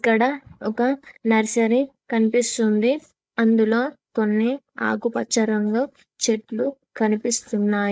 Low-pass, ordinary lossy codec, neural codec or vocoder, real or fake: none; none; codec, 16 kHz, 16 kbps, FreqCodec, larger model; fake